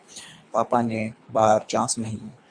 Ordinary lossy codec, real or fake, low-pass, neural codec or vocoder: MP3, 64 kbps; fake; 9.9 kHz; codec, 24 kHz, 3 kbps, HILCodec